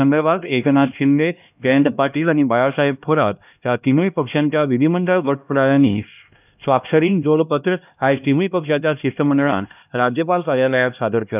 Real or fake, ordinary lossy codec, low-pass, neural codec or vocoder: fake; none; 3.6 kHz; codec, 16 kHz, 1 kbps, X-Codec, HuBERT features, trained on LibriSpeech